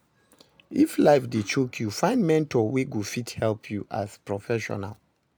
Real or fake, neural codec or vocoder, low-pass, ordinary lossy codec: real; none; none; none